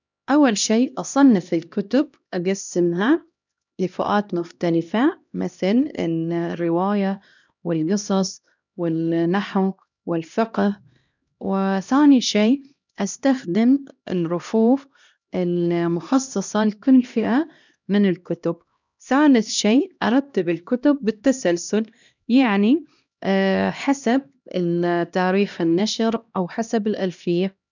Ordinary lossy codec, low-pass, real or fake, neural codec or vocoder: none; 7.2 kHz; fake; codec, 16 kHz, 1 kbps, X-Codec, HuBERT features, trained on LibriSpeech